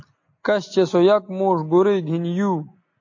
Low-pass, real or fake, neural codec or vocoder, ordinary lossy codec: 7.2 kHz; real; none; AAC, 48 kbps